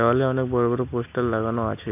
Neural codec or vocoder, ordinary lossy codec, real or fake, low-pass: none; none; real; 3.6 kHz